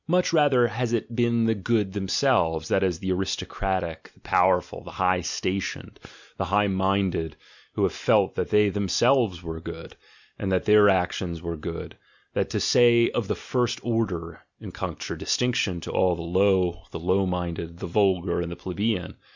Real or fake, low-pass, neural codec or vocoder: real; 7.2 kHz; none